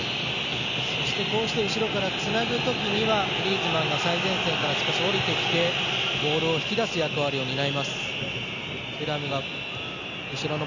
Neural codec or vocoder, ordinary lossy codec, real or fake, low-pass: none; none; real; 7.2 kHz